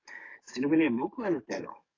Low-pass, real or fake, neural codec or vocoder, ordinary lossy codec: 7.2 kHz; fake; codec, 44.1 kHz, 7.8 kbps, DAC; AAC, 32 kbps